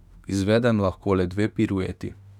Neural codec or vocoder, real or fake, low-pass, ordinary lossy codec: autoencoder, 48 kHz, 32 numbers a frame, DAC-VAE, trained on Japanese speech; fake; 19.8 kHz; none